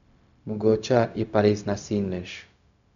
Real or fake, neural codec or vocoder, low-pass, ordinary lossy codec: fake; codec, 16 kHz, 0.4 kbps, LongCat-Audio-Codec; 7.2 kHz; none